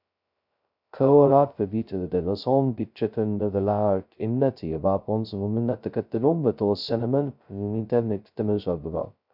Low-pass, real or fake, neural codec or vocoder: 5.4 kHz; fake; codec, 16 kHz, 0.2 kbps, FocalCodec